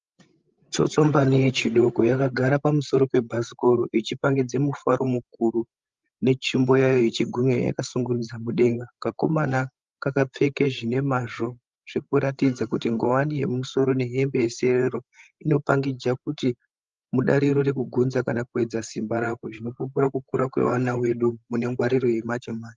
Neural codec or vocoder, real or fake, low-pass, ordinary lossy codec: codec, 16 kHz, 16 kbps, FreqCodec, larger model; fake; 7.2 kHz; Opus, 32 kbps